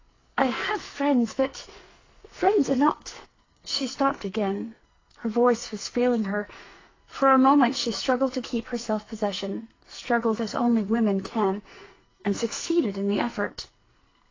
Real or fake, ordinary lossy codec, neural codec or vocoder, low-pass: fake; AAC, 32 kbps; codec, 44.1 kHz, 2.6 kbps, SNAC; 7.2 kHz